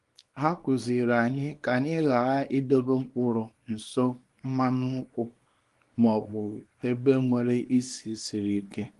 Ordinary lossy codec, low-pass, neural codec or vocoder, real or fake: Opus, 24 kbps; 10.8 kHz; codec, 24 kHz, 0.9 kbps, WavTokenizer, small release; fake